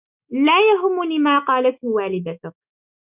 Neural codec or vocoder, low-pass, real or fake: autoencoder, 48 kHz, 128 numbers a frame, DAC-VAE, trained on Japanese speech; 3.6 kHz; fake